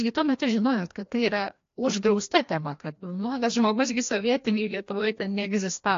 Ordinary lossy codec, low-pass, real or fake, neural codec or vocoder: AAC, 48 kbps; 7.2 kHz; fake; codec, 16 kHz, 1 kbps, FreqCodec, larger model